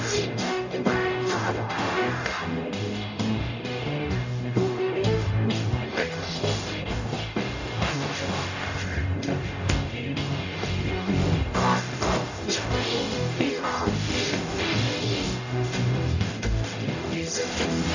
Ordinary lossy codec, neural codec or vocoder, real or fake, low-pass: none; codec, 44.1 kHz, 0.9 kbps, DAC; fake; 7.2 kHz